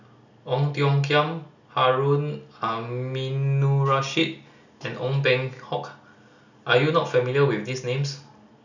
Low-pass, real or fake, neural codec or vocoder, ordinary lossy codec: 7.2 kHz; real; none; none